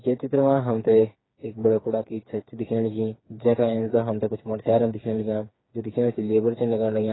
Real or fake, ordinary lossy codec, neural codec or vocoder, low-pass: fake; AAC, 16 kbps; codec, 16 kHz, 4 kbps, FreqCodec, smaller model; 7.2 kHz